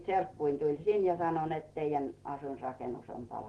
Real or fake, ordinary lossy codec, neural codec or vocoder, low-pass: real; Opus, 16 kbps; none; 10.8 kHz